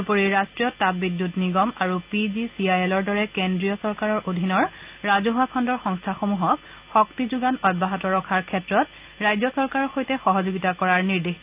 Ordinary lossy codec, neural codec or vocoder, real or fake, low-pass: Opus, 24 kbps; none; real; 3.6 kHz